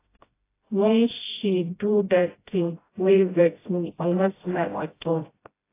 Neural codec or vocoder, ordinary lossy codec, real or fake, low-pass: codec, 16 kHz, 0.5 kbps, FreqCodec, smaller model; AAC, 24 kbps; fake; 3.6 kHz